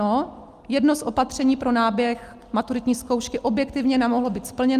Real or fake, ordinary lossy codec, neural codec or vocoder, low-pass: real; Opus, 32 kbps; none; 14.4 kHz